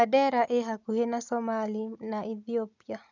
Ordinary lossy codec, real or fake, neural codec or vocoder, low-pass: none; real; none; 7.2 kHz